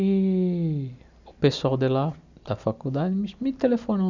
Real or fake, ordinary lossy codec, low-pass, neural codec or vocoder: real; none; 7.2 kHz; none